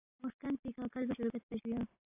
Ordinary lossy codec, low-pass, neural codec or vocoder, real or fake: AAC, 32 kbps; 3.6 kHz; none; real